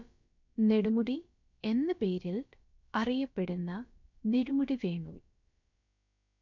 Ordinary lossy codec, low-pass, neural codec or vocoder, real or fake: none; 7.2 kHz; codec, 16 kHz, about 1 kbps, DyCAST, with the encoder's durations; fake